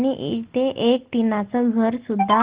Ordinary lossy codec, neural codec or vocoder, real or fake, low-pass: Opus, 16 kbps; none; real; 3.6 kHz